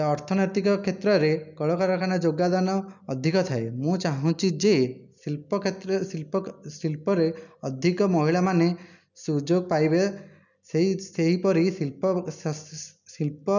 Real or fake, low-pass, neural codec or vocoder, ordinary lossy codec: real; 7.2 kHz; none; none